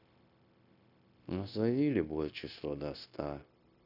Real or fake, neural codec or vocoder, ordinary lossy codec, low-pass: fake; codec, 16 kHz, 0.9 kbps, LongCat-Audio-Codec; none; 5.4 kHz